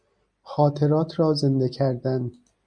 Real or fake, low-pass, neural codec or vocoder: real; 9.9 kHz; none